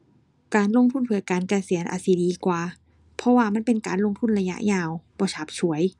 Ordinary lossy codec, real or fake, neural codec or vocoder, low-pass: none; real; none; 10.8 kHz